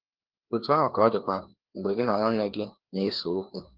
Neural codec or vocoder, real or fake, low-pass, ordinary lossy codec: codec, 16 kHz, 2 kbps, FreqCodec, larger model; fake; 5.4 kHz; Opus, 16 kbps